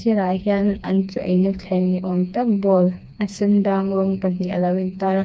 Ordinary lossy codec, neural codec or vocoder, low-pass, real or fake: none; codec, 16 kHz, 2 kbps, FreqCodec, smaller model; none; fake